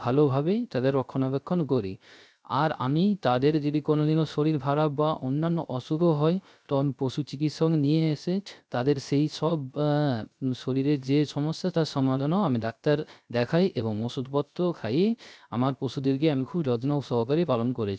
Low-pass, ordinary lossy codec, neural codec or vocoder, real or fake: none; none; codec, 16 kHz, 0.3 kbps, FocalCodec; fake